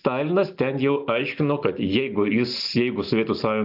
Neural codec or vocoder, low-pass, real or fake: none; 5.4 kHz; real